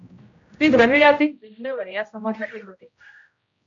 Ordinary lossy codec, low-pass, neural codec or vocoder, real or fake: AAC, 64 kbps; 7.2 kHz; codec, 16 kHz, 0.5 kbps, X-Codec, HuBERT features, trained on general audio; fake